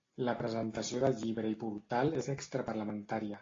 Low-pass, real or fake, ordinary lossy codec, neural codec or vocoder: 7.2 kHz; real; AAC, 32 kbps; none